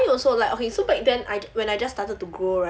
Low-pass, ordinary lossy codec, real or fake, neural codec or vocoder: none; none; real; none